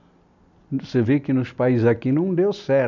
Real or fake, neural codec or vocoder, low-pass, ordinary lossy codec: real; none; 7.2 kHz; none